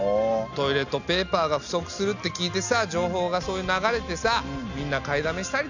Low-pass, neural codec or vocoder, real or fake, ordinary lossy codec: 7.2 kHz; none; real; none